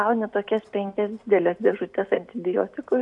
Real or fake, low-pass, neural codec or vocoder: real; 10.8 kHz; none